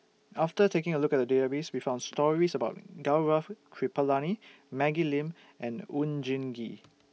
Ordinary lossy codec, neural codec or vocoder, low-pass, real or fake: none; none; none; real